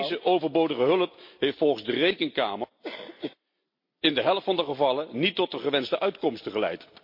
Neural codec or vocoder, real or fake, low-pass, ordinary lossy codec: none; real; 5.4 kHz; MP3, 32 kbps